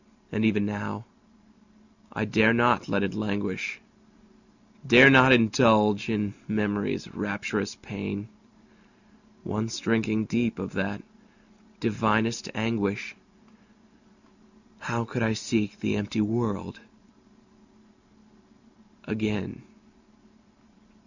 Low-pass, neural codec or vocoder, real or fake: 7.2 kHz; none; real